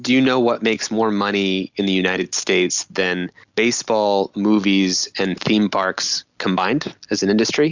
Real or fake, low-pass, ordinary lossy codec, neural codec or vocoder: real; 7.2 kHz; Opus, 64 kbps; none